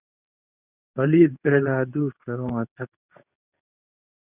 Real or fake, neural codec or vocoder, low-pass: fake; codec, 24 kHz, 0.9 kbps, WavTokenizer, medium speech release version 2; 3.6 kHz